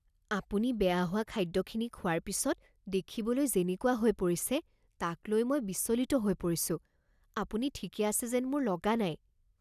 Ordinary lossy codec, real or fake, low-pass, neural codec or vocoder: none; real; 14.4 kHz; none